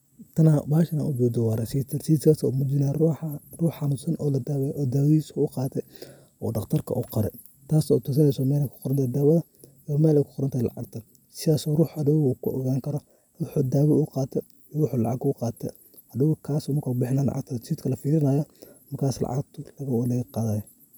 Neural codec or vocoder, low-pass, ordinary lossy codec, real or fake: none; none; none; real